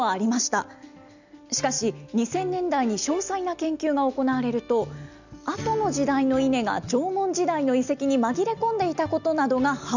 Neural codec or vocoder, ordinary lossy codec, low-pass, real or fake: none; none; 7.2 kHz; real